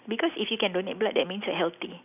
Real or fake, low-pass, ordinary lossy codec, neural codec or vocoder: real; 3.6 kHz; none; none